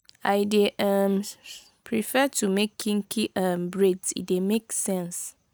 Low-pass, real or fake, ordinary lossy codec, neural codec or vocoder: none; real; none; none